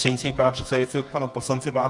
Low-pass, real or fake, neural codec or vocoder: 10.8 kHz; fake; codec, 24 kHz, 0.9 kbps, WavTokenizer, medium music audio release